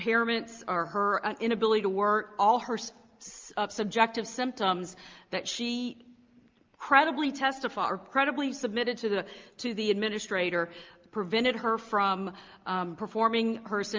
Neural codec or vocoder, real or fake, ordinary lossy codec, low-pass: none; real; Opus, 24 kbps; 7.2 kHz